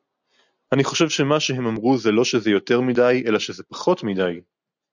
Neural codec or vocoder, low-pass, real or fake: none; 7.2 kHz; real